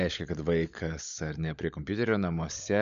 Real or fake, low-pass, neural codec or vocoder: fake; 7.2 kHz; codec, 16 kHz, 16 kbps, FunCodec, trained on LibriTTS, 50 frames a second